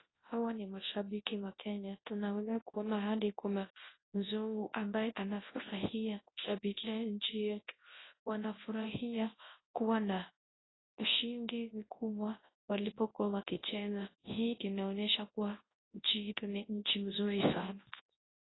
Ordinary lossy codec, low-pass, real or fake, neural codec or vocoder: AAC, 16 kbps; 7.2 kHz; fake; codec, 24 kHz, 0.9 kbps, WavTokenizer, large speech release